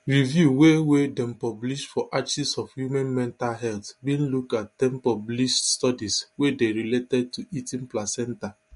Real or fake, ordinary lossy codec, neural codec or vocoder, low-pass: real; MP3, 48 kbps; none; 10.8 kHz